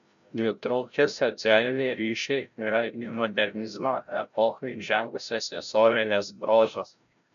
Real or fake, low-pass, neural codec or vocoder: fake; 7.2 kHz; codec, 16 kHz, 0.5 kbps, FreqCodec, larger model